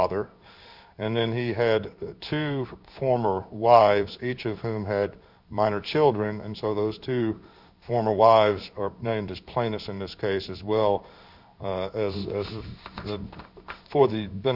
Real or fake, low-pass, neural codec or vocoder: fake; 5.4 kHz; codec, 16 kHz in and 24 kHz out, 1 kbps, XY-Tokenizer